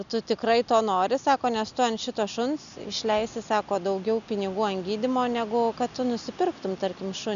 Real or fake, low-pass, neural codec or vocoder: real; 7.2 kHz; none